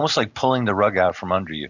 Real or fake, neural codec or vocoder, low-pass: real; none; 7.2 kHz